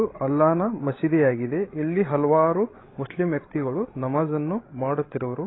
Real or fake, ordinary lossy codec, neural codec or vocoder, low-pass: fake; AAC, 16 kbps; codec, 16 kHz, 16 kbps, FreqCodec, larger model; 7.2 kHz